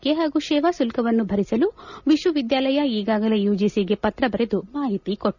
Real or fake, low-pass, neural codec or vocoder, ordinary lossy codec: real; 7.2 kHz; none; none